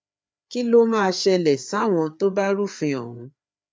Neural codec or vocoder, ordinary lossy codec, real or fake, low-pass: codec, 16 kHz, 4 kbps, FreqCodec, larger model; none; fake; none